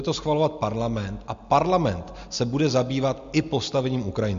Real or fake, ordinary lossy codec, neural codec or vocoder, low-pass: real; MP3, 48 kbps; none; 7.2 kHz